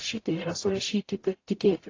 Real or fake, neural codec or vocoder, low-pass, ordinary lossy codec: fake; codec, 44.1 kHz, 0.9 kbps, DAC; 7.2 kHz; AAC, 32 kbps